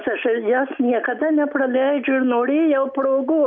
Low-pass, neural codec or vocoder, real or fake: 7.2 kHz; none; real